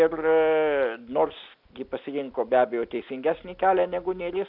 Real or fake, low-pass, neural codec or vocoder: real; 5.4 kHz; none